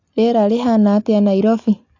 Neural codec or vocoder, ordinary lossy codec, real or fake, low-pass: none; AAC, 48 kbps; real; 7.2 kHz